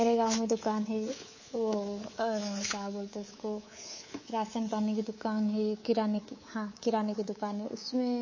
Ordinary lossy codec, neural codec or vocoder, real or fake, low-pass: MP3, 32 kbps; codec, 24 kHz, 3.1 kbps, DualCodec; fake; 7.2 kHz